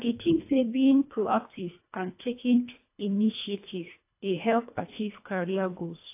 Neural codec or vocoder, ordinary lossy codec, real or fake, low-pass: codec, 24 kHz, 1.5 kbps, HILCodec; AAC, 24 kbps; fake; 3.6 kHz